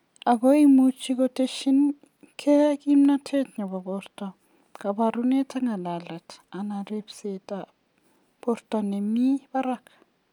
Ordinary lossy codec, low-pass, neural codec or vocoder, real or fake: none; 19.8 kHz; none; real